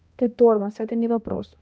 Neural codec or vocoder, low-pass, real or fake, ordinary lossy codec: codec, 16 kHz, 1 kbps, X-Codec, HuBERT features, trained on balanced general audio; none; fake; none